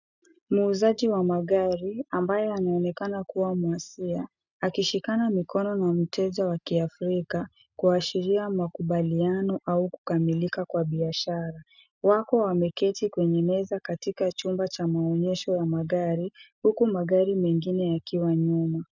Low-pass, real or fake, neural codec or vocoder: 7.2 kHz; real; none